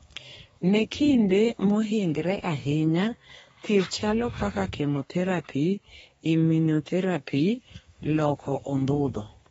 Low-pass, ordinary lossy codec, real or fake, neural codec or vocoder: 14.4 kHz; AAC, 24 kbps; fake; codec, 32 kHz, 1.9 kbps, SNAC